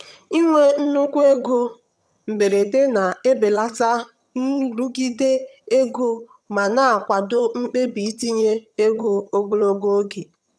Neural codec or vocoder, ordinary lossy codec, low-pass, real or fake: vocoder, 22.05 kHz, 80 mel bands, HiFi-GAN; none; none; fake